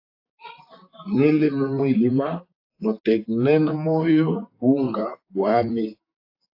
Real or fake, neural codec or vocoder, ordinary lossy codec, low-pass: fake; vocoder, 44.1 kHz, 128 mel bands, Pupu-Vocoder; AAC, 32 kbps; 5.4 kHz